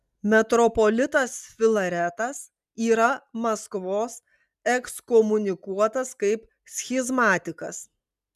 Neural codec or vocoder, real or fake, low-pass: none; real; 14.4 kHz